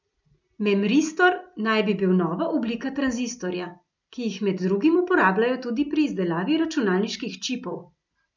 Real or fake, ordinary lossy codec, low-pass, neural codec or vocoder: real; none; 7.2 kHz; none